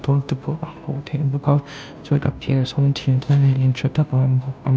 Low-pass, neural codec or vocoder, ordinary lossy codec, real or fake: none; codec, 16 kHz, 0.5 kbps, FunCodec, trained on Chinese and English, 25 frames a second; none; fake